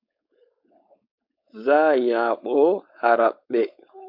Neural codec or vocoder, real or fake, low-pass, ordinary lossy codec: codec, 16 kHz, 4.8 kbps, FACodec; fake; 5.4 kHz; AAC, 48 kbps